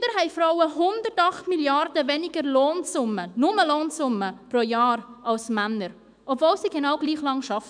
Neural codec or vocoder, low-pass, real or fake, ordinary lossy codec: autoencoder, 48 kHz, 128 numbers a frame, DAC-VAE, trained on Japanese speech; 9.9 kHz; fake; none